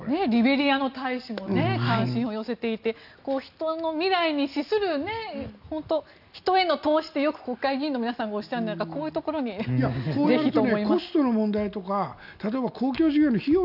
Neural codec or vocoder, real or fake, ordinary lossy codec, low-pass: none; real; none; 5.4 kHz